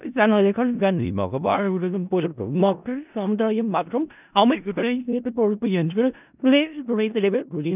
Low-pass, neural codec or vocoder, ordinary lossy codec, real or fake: 3.6 kHz; codec, 16 kHz in and 24 kHz out, 0.4 kbps, LongCat-Audio-Codec, four codebook decoder; none; fake